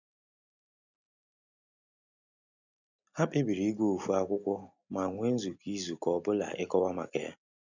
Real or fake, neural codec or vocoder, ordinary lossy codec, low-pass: real; none; none; 7.2 kHz